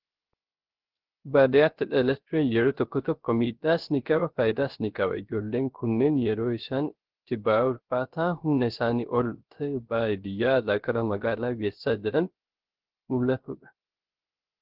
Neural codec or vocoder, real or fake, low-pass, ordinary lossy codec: codec, 16 kHz, 0.3 kbps, FocalCodec; fake; 5.4 kHz; Opus, 16 kbps